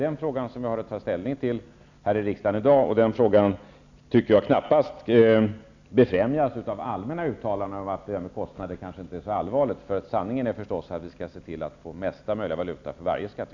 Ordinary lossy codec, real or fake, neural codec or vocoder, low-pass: none; real; none; 7.2 kHz